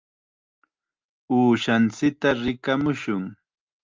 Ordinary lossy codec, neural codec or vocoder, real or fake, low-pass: Opus, 24 kbps; none; real; 7.2 kHz